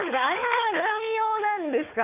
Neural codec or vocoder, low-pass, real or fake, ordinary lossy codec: codec, 16 kHz, 4 kbps, FunCodec, trained on Chinese and English, 50 frames a second; 3.6 kHz; fake; none